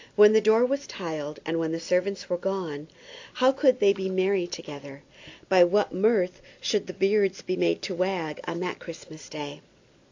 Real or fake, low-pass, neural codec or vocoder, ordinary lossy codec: real; 7.2 kHz; none; AAC, 48 kbps